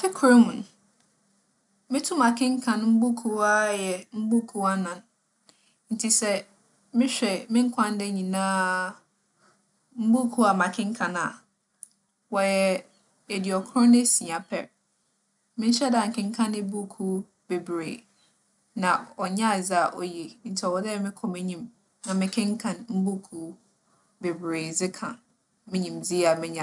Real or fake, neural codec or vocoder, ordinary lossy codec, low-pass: real; none; none; 10.8 kHz